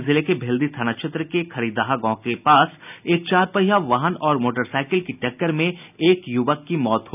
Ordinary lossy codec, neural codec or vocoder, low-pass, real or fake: none; none; 3.6 kHz; real